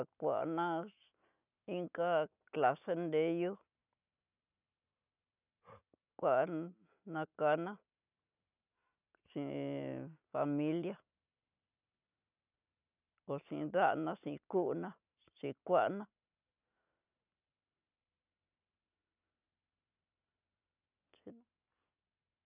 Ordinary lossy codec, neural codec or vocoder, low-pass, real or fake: none; none; 3.6 kHz; real